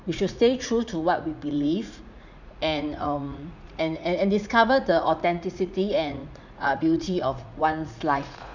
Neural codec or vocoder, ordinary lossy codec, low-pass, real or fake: vocoder, 44.1 kHz, 80 mel bands, Vocos; none; 7.2 kHz; fake